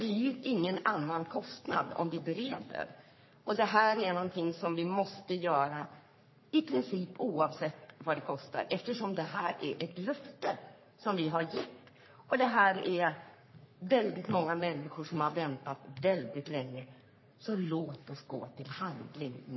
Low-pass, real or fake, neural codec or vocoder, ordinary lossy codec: 7.2 kHz; fake; codec, 44.1 kHz, 3.4 kbps, Pupu-Codec; MP3, 24 kbps